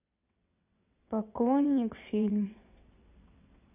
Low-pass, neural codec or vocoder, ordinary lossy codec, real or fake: 3.6 kHz; vocoder, 22.05 kHz, 80 mel bands, WaveNeXt; AAC, 24 kbps; fake